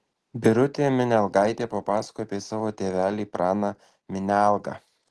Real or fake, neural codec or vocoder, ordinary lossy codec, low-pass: real; none; Opus, 16 kbps; 10.8 kHz